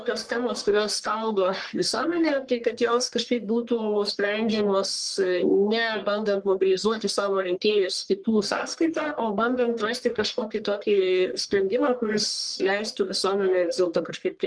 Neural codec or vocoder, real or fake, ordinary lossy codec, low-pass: codec, 44.1 kHz, 1.7 kbps, Pupu-Codec; fake; Opus, 24 kbps; 9.9 kHz